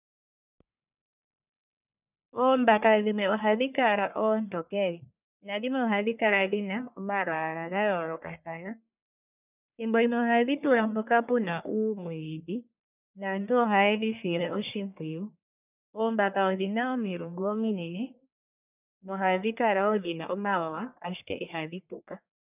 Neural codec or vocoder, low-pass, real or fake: codec, 44.1 kHz, 1.7 kbps, Pupu-Codec; 3.6 kHz; fake